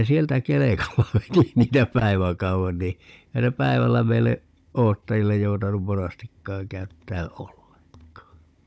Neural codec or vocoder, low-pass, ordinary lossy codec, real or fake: codec, 16 kHz, 16 kbps, FunCodec, trained on Chinese and English, 50 frames a second; none; none; fake